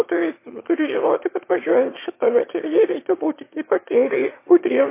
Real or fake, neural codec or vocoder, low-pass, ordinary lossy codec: fake; autoencoder, 22.05 kHz, a latent of 192 numbers a frame, VITS, trained on one speaker; 3.6 kHz; MP3, 24 kbps